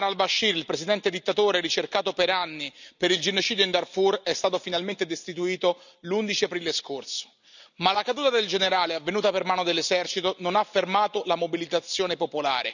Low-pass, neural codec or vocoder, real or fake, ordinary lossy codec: 7.2 kHz; none; real; none